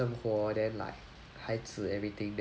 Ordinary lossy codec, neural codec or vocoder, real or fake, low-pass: none; none; real; none